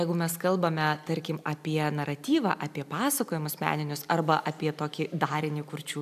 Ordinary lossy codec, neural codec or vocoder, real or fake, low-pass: AAC, 96 kbps; none; real; 14.4 kHz